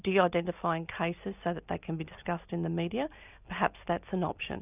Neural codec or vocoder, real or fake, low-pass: none; real; 3.6 kHz